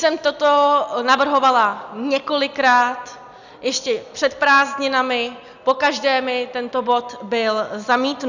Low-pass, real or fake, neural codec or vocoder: 7.2 kHz; real; none